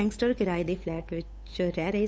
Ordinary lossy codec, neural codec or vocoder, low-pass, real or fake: none; codec, 16 kHz, 8 kbps, FunCodec, trained on Chinese and English, 25 frames a second; none; fake